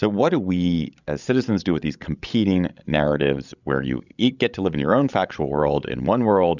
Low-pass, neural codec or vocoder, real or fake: 7.2 kHz; codec, 16 kHz, 16 kbps, FreqCodec, larger model; fake